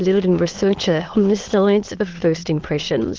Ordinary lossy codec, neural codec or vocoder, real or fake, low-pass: Opus, 16 kbps; autoencoder, 22.05 kHz, a latent of 192 numbers a frame, VITS, trained on many speakers; fake; 7.2 kHz